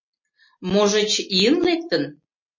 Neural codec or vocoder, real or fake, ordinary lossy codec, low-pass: none; real; MP3, 32 kbps; 7.2 kHz